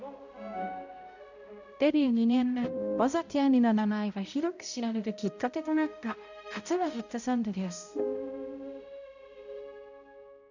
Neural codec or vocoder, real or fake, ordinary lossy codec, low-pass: codec, 16 kHz, 0.5 kbps, X-Codec, HuBERT features, trained on balanced general audio; fake; none; 7.2 kHz